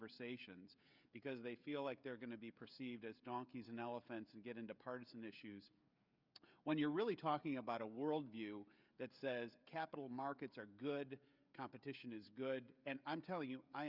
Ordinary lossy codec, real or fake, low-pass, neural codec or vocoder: Opus, 64 kbps; fake; 5.4 kHz; codec, 16 kHz, 16 kbps, FreqCodec, smaller model